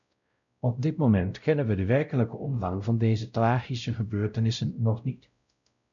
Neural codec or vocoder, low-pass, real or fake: codec, 16 kHz, 0.5 kbps, X-Codec, WavLM features, trained on Multilingual LibriSpeech; 7.2 kHz; fake